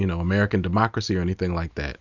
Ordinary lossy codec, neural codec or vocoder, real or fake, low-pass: Opus, 64 kbps; none; real; 7.2 kHz